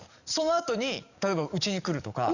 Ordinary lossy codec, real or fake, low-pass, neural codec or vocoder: none; fake; 7.2 kHz; codec, 44.1 kHz, 7.8 kbps, DAC